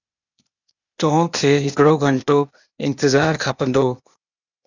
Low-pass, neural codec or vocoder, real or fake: 7.2 kHz; codec, 16 kHz, 0.8 kbps, ZipCodec; fake